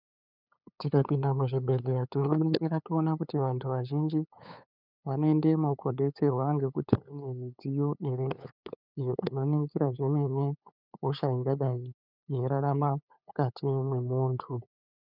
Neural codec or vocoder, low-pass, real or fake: codec, 16 kHz, 8 kbps, FunCodec, trained on LibriTTS, 25 frames a second; 5.4 kHz; fake